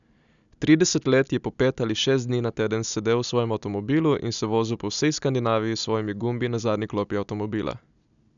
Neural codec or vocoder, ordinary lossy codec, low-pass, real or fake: none; none; 7.2 kHz; real